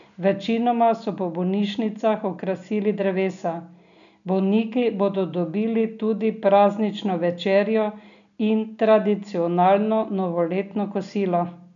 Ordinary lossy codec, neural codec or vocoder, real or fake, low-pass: none; none; real; 7.2 kHz